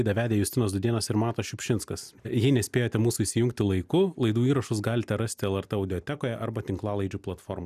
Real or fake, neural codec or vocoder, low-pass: real; none; 14.4 kHz